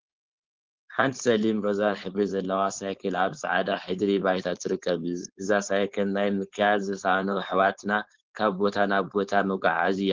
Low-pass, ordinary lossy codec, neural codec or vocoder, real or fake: 7.2 kHz; Opus, 16 kbps; codec, 16 kHz, 4.8 kbps, FACodec; fake